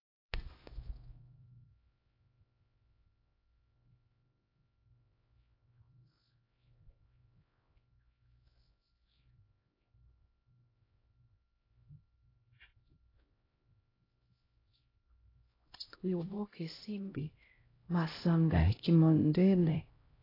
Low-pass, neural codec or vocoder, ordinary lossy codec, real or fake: 5.4 kHz; codec, 16 kHz, 0.5 kbps, X-Codec, HuBERT features, trained on LibriSpeech; AAC, 24 kbps; fake